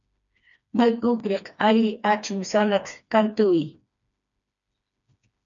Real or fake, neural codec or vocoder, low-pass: fake; codec, 16 kHz, 2 kbps, FreqCodec, smaller model; 7.2 kHz